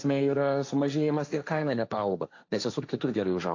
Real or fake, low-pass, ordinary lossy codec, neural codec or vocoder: fake; 7.2 kHz; AAC, 48 kbps; codec, 16 kHz, 1.1 kbps, Voila-Tokenizer